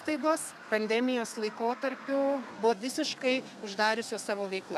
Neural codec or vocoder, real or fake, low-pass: codec, 32 kHz, 1.9 kbps, SNAC; fake; 14.4 kHz